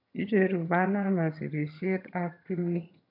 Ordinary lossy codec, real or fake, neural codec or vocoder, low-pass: none; fake; vocoder, 22.05 kHz, 80 mel bands, HiFi-GAN; 5.4 kHz